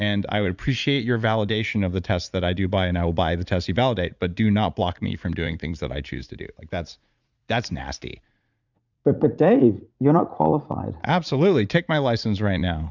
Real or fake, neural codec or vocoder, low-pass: fake; vocoder, 44.1 kHz, 80 mel bands, Vocos; 7.2 kHz